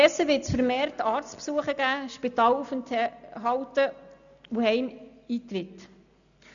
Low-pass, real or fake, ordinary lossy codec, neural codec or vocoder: 7.2 kHz; real; none; none